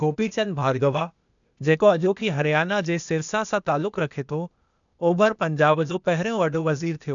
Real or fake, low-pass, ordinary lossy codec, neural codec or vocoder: fake; 7.2 kHz; none; codec, 16 kHz, 0.8 kbps, ZipCodec